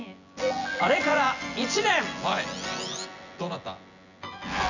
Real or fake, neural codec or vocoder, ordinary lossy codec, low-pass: fake; vocoder, 24 kHz, 100 mel bands, Vocos; none; 7.2 kHz